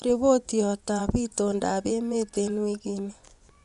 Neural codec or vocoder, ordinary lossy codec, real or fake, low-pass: vocoder, 24 kHz, 100 mel bands, Vocos; none; fake; 10.8 kHz